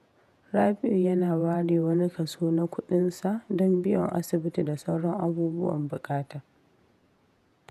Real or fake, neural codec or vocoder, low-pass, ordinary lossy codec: fake; vocoder, 48 kHz, 128 mel bands, Vocos; 14.4 kHz; none